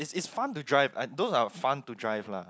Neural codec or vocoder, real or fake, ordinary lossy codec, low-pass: none; real; none; none